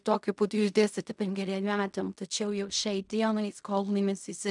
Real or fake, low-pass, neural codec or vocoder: fake; 10.8 kHz; codec, 16 kHz in and 24 kHz out, 0.4 kbps, LongCat-Audio-Codec, fine tuned four codebook decoder